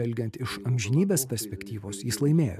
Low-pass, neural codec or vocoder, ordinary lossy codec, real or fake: 14.4 kHz; none; MP3, 96 kbps; real